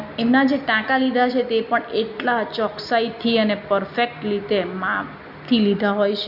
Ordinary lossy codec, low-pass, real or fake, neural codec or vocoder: none; 5.4 kHz; real; none